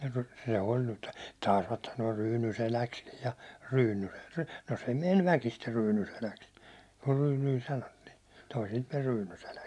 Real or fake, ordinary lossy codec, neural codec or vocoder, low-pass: real; none; none; none